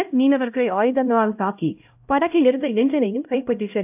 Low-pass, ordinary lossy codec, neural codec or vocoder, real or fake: 3.6 kHz; AAC, 32 kbps; codec, 16 kHz, 0.5 kbps, X-Codec, HuBERT features, trained on LibriSpeech; fake